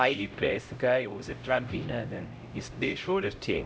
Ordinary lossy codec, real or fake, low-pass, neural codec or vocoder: none; fake; none; codec, 16 kHz, 0.5 kbps, X-Codec, HuBERT features, trained on LibriSpeech